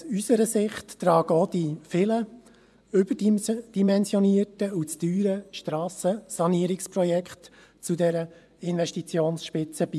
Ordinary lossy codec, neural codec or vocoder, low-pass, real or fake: none; vocoder, 24 kHz, 100 mel bands, Vocos; none; fake